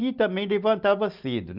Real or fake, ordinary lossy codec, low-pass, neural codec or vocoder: real; Opus, 24 kbps; 5.4 kHz; none